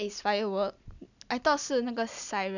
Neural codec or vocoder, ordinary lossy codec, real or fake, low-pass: none; none; real; 7.2 kHz